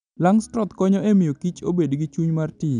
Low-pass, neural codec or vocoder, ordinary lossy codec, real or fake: 9.9 kHz; none; MP3, 96 kbps; real